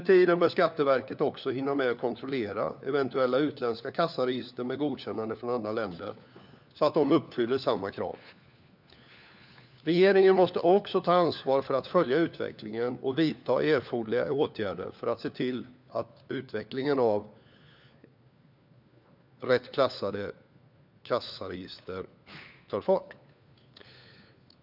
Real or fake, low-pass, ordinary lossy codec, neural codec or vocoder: fake; 5.4 kHz; none; codec, 16 kHz, 4 kbps, FunCodec, trained on LibriTTS, 50 frames a second